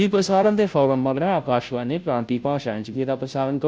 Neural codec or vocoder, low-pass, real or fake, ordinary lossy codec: codec, 16 kHz, 0.5 kbps, FunCodec, trained on Chinese and English, 25 frames a second; none; fake; none